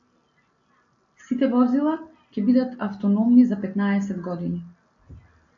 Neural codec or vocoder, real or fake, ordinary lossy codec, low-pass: none; real; AAC, 64 kbps; 7.2 kHz